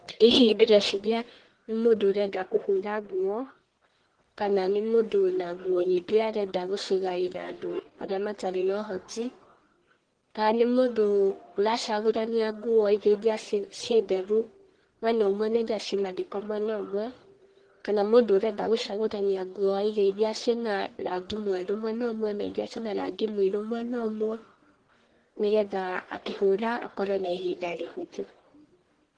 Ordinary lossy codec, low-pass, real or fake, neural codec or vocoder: Opus, 16 kbps; 9.9 kHz; fake; codec, 44.1 kHz, 1.7 kbps, Pupu-Codec